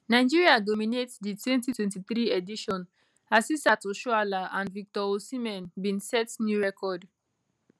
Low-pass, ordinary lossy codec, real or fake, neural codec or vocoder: none; none; real; none